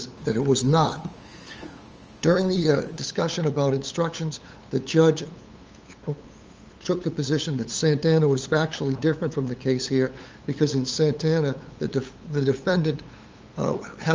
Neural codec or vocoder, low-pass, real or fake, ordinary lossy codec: codec, 16 kHz, 8 kbps, FunCodec, trained on LibriTTS, 25 frames a second; 7.2 kHz; fake; Opus, 24 kbps